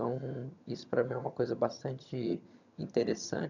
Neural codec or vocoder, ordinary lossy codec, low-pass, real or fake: vocoder, 22.05 kHz, 80 mel bands, HiFi-GAN; none; 7.2 kHz; fake